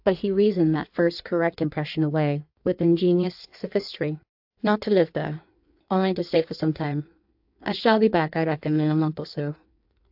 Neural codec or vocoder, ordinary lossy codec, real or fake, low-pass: codec, 16 kHz in and 24 kHz out, 1.1 kbps, FireRedTTS-2 codec; AAC, 48 kbps; fake; 5.4 kHz